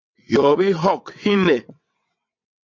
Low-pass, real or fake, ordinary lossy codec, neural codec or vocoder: 7.2 kHz; fake; MP3, 64 kbps; vocoder, 22.05 kHz, 80 mel bands, WaveNeXt